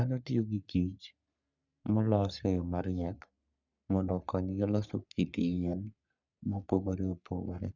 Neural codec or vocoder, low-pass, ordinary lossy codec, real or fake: codec, 44.1 kHz, 3.4 kbps, Pupu-Codec; 7.2 kHz; none; fake